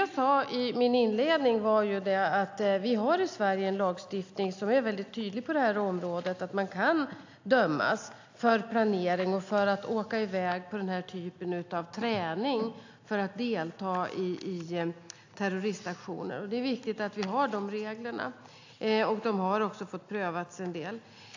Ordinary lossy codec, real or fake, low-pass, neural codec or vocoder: AAC, 48 kbps; real; 7.2 kHz; none